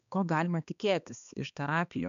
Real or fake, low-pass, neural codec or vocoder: fake; 7.2 kHz; codec, 16 kHz, 2 kbps, X-Codec, HuBERT features, trained on balanced general audio